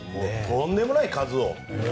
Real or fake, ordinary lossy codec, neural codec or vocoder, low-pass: real; none; none; none